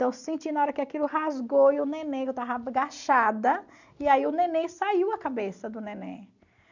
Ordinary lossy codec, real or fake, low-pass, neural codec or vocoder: none; real; 7.2 kHz; none